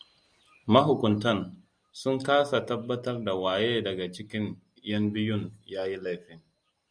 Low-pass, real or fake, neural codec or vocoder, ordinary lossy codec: 9.9 kHz; real; none; Opus, 64 kbps